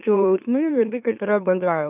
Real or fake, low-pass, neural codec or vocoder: fake; 3.6 kHz; autoencoder, 44.1 kHz, a latent of 192 numbers a frame, MeloTTS